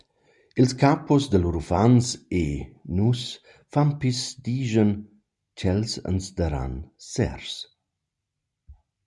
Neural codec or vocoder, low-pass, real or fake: none; 10.8 kHz; real